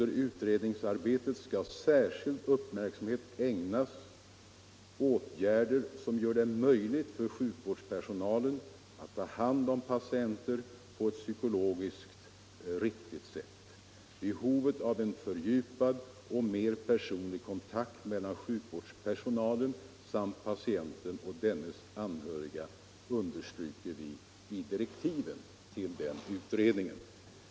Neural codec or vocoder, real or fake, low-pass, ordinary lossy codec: none; real; none; none